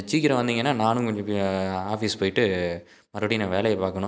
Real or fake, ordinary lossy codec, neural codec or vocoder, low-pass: real; none; none; none